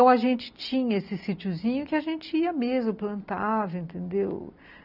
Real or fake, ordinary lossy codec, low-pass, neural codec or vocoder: real; none; 5.4 kHz; none